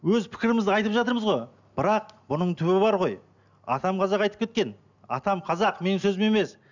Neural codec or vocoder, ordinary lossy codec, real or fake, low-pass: none; none; real; 7.2 kHz